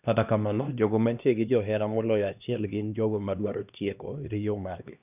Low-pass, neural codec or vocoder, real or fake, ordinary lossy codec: 3.6 kHz; codec, 16 kHz, 1 kbps, X-Codec, HuBERT features, trained on LibriSpeech; fake; none